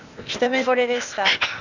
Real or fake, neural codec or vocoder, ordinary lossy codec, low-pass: fake; codec, 16 kHz, 0.8 kbps, ZipCodec; none; 7.2 kHz